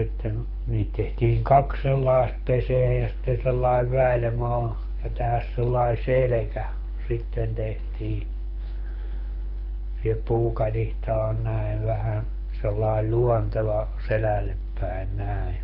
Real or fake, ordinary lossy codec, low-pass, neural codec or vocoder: fake; none; 5.4 kHz; codec, 24 kHz, 6 kbps, HILCodec